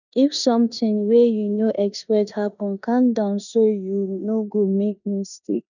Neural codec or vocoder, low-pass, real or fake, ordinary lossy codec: codec, 16 kHz in and 24 kHz out, 0.9 kbps, LongCat-Audio-Codec, four codebook decoder; 7.2 kHz; fake; none